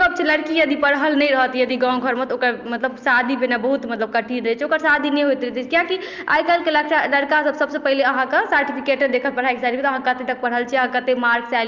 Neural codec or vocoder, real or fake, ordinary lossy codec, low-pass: none; real; Opus, 24 kbps; 7.2 kHz